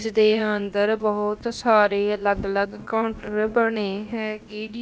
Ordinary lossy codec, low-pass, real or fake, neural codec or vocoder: none; none; fake; codec, 16 kHz, about 1 kbps, DyCAST, with the encoder's durations